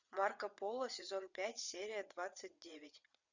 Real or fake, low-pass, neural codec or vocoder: fake; 7.2 kHz; vocoder, 22.05 kHz, 80 mel bands, WaveNeXt